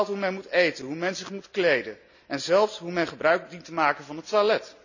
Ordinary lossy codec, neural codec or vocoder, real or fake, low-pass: none; none; real; 7.2 kHz